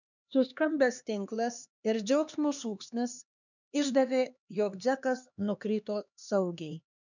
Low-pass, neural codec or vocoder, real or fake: 7.2 kHz; codec, 16 kHz, 2 kbps, X-Codec, HuBERT features, trained on LibriSpeech; fake